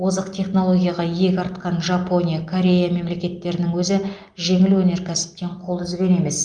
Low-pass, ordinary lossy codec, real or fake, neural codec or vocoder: 9.9 kHz; Opus, 32 kbps; real; none